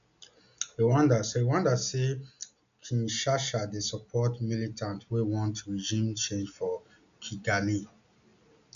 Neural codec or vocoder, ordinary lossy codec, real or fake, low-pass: none; none; real; 7.2 kHz